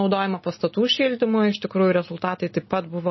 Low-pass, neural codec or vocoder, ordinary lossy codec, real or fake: 7.2 kHz; none; MP3, 24 kbps; real